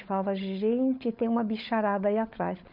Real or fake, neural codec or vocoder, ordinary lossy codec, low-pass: fake; codec, 16 kHz, 16 kbps, FunCodec, trained on LibriTTS, 50 frames a second; none; 5.4 kHz